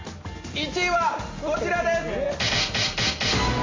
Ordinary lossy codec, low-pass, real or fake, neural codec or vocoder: AAC, 32 kbps; 7.2 kHz; real; none